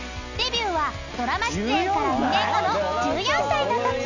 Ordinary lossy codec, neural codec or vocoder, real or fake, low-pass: none; none; real; 7.2 kHz